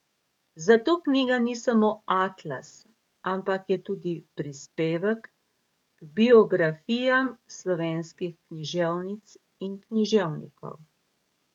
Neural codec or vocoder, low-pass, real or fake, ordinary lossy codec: codec, 44.1 kHz, 7.8 kbps, DAC; 19.8 kHz; fake; none